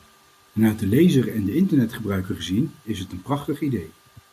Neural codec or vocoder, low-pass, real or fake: none; 14.4 kHz; real